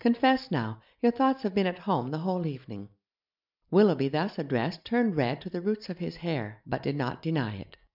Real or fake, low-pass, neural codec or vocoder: real; 5.4 kHz; none